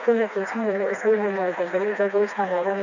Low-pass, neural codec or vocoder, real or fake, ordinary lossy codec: 7.2 kHz; codec, 16 kHz, 2 kbps, FreqCodec, smaller model; fake; none